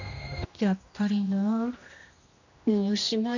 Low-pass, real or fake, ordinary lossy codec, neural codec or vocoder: 7.2 kHz; fake; none; codec, 16 kHz, 1 kbps, X-Codec, HuBERT features, trained on general audio